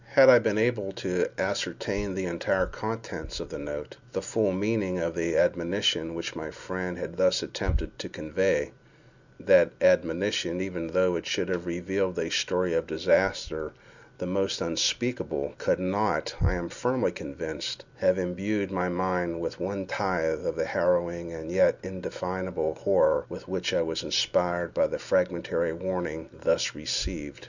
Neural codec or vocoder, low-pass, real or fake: none; 7.2 kHz; real